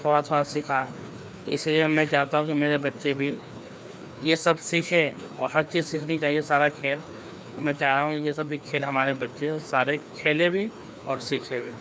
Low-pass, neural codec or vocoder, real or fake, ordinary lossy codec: none; codec, 16 kHz, 2 kbps, FreqCodec, larger model; fake; none